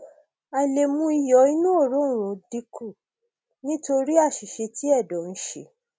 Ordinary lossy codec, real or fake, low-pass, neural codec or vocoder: none; real; none; none